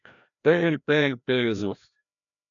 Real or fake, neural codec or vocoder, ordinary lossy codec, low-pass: fake; codec, 16 kHz, 1 kbps, FreqCodec, larger model; MP3, 96 kbps; 7.2 kHz